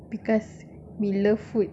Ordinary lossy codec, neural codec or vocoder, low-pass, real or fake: none; none; none; real